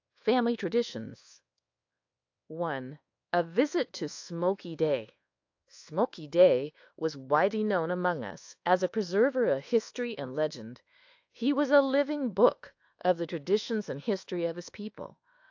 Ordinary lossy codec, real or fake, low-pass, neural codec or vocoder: AAC, 48 kbps; fake; 7.2 kHz; codec, 24 kHz, 1.2 kbps, DualCodec